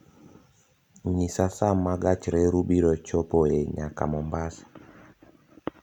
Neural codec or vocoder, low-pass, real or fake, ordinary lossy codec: none; 19.8 kHz; real; none